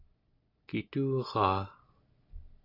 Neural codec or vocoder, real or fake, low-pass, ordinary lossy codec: none; real; 5.4 kHz; AAC, 48 kbps